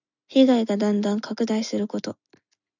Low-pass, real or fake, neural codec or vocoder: 7.2 kHz; real; none